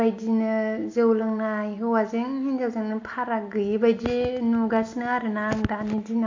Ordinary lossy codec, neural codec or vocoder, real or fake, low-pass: AAC, 48 kbps; none; real; 7.2 kHz